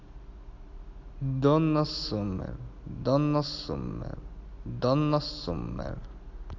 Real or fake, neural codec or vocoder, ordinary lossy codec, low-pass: real; none; AAC, 48 kbps; 7.2 kHz